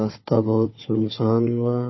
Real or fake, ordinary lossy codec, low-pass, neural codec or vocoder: fake; MP3, 24 kbps; 7.2 kHz; codec, 16 kHz, 4 kbps, FunCodec, trained on Chinese and English, 50 frames a second